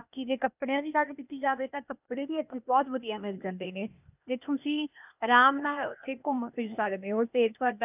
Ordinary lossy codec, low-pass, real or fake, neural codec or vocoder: none; 3.6 kHz; fake; codec, 16 kHz, 0.8 kbps, ZipCodec